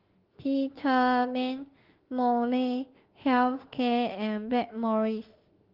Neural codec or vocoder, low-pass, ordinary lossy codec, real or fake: autoencoder, 48 kHz, 32 numbers a frame, DAC-VAE, trained on Japanese speech; 5.4 kHz; Opus, 16 kbps; fake